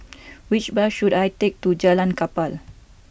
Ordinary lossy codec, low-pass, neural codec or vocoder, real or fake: none; none; none; real